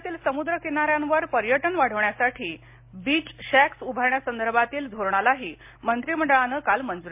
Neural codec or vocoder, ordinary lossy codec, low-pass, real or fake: none; MP3, 32 kbps; 3.6 kHz; real